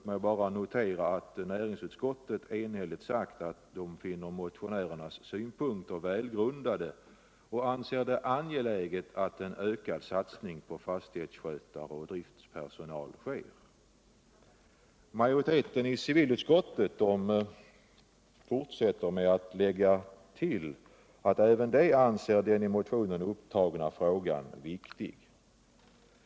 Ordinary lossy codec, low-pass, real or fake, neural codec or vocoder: none; none; real; none